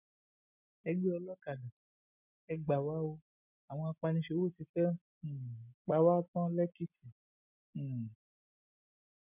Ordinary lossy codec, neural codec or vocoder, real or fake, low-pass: none; none; real; 3.6 kHz